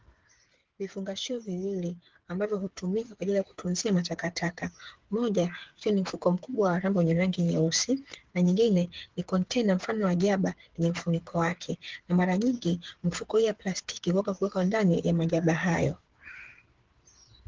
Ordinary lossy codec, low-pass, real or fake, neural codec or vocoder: Opus, 16 kbps; 7.2 kHz; fake; codec, 16 kHz, 8 kbps, FreqCodec, smaller model